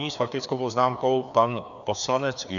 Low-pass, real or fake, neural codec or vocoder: 7.2 kHz; fake; codec, 16 kHz, 2 kbps, FreqCodec, larger model